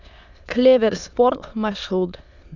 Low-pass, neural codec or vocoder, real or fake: 7.2 kHz; autoencoder, 22.05 kHz, a latent of 192 numbers a frame, VITS, trained on many speakers; fake